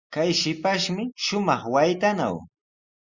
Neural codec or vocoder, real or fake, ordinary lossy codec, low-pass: none; real; Opus, 64 kbps; 7.2 kHz